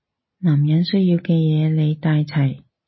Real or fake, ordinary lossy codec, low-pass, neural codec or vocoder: real; MP3, 24 kbps; 7.2 kHz; none